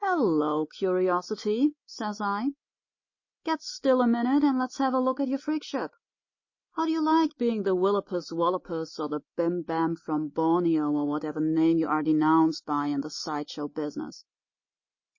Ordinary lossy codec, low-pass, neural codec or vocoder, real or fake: MP3, 32 kbps; 7.2 kHz; none; real